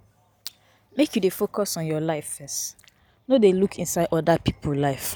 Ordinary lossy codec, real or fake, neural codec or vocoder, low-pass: none; real; none; none